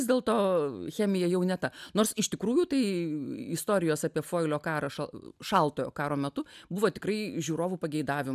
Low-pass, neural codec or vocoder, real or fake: 14.4 kHz; none; real